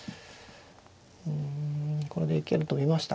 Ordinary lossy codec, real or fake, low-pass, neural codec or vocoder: none; real; none; none